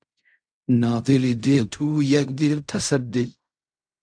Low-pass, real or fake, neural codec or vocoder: 9.9 kHz; fake; codec, 16 kHz in and 24 kHz out, 0.4 kbps, LongCat-Audio-Codec, fine tuned four codebook decoder